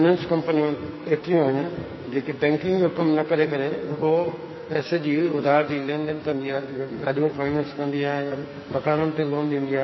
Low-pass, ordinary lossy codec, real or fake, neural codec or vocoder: 7.2 kHz; MP3, 24 kbps; fake; codec, 44.1 kHz, 2.6 kbps, SNAC